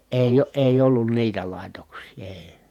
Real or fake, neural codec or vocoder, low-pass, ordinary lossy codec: fake; vocoder, 48 kHz, 128 mel bands, Vocos; 19.8 kHz; none